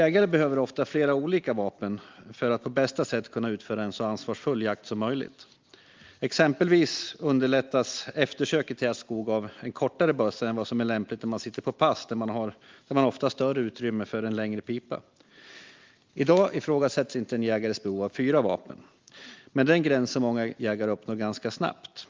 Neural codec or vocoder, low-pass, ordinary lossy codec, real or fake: none; 7.2 kHz; Opus, 32 kbps; real